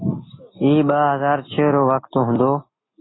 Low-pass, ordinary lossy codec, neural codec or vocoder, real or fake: 7.2 kHz; AAC, 16 kbps; none; real